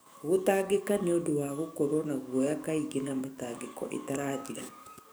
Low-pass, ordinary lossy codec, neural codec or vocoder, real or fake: none; none; codec, 44.1 kHz, 7.8 kbps, DAC; fake